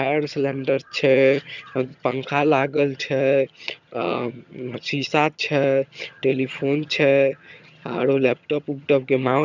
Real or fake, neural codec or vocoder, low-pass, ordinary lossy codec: fake; vocoder, 22.05 kHz, 80 mel bands, HiFi-GAN; 7.2 kHz; none